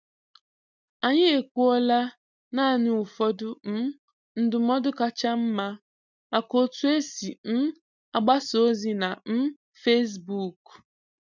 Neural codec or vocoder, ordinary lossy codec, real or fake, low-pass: none; none; real; 7.2 kHz